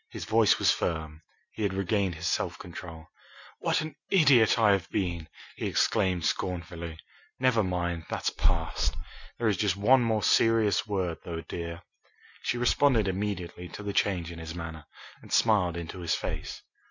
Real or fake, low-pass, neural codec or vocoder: real; 7.2 kHz; none